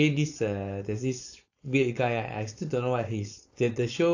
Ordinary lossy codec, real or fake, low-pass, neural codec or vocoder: AAC, 48 kbps; fake; 7.2 kHz; codec, 16 kHz, 4.8 kbps, FACodec